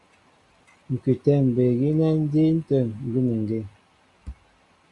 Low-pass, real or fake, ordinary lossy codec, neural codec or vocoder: 10.8 kHz; real; Opus, 64 kbps; none